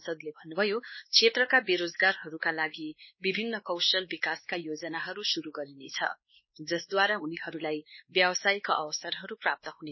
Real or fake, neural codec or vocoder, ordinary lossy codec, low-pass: fake; codec, 16 kHz, 2 kbps, X-Codec, WavLM features, trained on Multilingual LibriSpeech; MP3, 24 kbps; 7.2 kHz